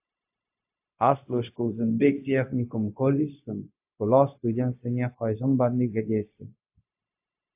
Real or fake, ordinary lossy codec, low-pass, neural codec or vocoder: fake; AAC, 32 kbps; 3.6 kHz; codec, 16 kHz, 0.4 kbps, LongCat-Audio-Codec